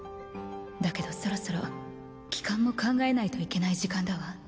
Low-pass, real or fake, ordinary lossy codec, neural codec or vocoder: none; real; none; none